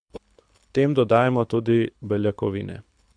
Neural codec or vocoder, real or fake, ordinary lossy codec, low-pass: codec, 24 kHz, 6 kbps, HILCodec; fake; AAC, 64 kbps; 9.9 kHz